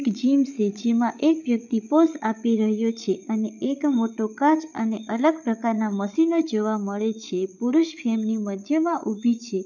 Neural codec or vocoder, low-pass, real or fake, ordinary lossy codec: codec, 16 kHz, 8 kbps, FreqCodec, larger model; 7.2 kHz; fake; none